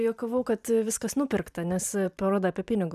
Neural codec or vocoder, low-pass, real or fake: vocoder, 44.1 kHz, 128 mel bands every 512 samples, BigVGAN v2; 14.4 kHz; fake